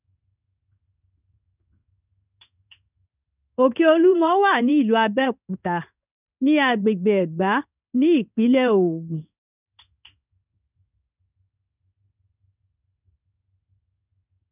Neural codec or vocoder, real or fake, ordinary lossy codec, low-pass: codec, 16 kHz in and 24 kHz out, 1 kbps, XY-Tokenizer; fake; none; 3.6 kHz